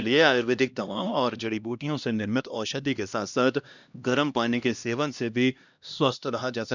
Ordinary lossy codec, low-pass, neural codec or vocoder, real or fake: none; 7.2 kHz; codec, 16 kHz, 1 kbps, X-Codec, HuBERT features, trained on LibriSpeech; fake